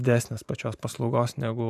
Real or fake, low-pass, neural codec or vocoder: fake; 14.4 kHz; vocoder, 44.1 kHz, 128 mel bands every 512 samples, BigVGAN v2